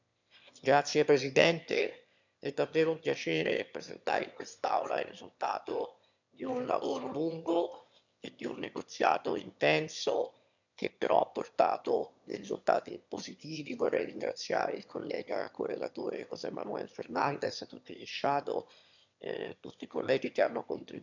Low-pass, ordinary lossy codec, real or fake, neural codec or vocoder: 7.2 kHz; none; fake; autoencoder, 22.05 kHz, a latent of 192 numbers a frame, VITS, trained on one speaker